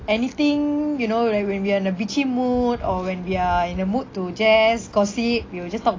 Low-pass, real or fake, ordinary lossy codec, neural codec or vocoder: 7.2 kHz; real; AAC, 32 kbps; none